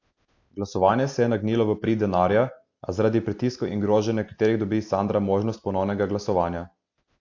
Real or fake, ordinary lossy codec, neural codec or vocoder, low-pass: real; AAC, 48 kbps; none; 7.2 kHz